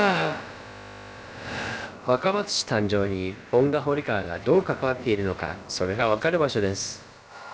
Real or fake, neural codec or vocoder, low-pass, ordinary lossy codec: fake; codec, 16 kHz, about 1 kbps, DyCAST, with the encoder's durations; none; none